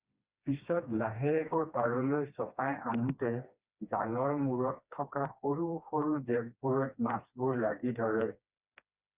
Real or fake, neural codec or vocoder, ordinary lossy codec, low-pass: fake; codec, 16 kHz, 2 kbps, FreqCodec, smaller model; Opus, 64 kbps; 3.6 kHz